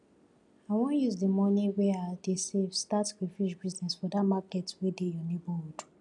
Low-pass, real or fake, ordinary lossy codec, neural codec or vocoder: 10.8 kHz; real; none; none